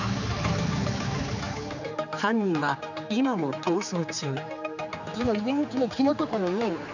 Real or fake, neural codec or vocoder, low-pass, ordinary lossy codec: fake; codec, 16 kHz, 4 kbps, X-Codec, HuBERT features, trained on general audio; 7.2 kHz; none